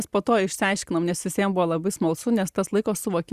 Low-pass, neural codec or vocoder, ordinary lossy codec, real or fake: 14.4 kHz; none; Opus, 64 kbps; real